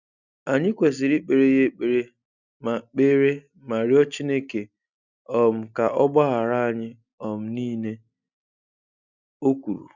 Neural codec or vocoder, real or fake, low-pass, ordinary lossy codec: none; real; 7.2 kHz; none